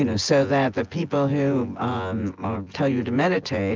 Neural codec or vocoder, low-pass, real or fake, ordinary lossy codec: vocoder, 24 kHz, 100 mel bands, Vocos; 7.2 kHz; fake; Opus, 24 kbps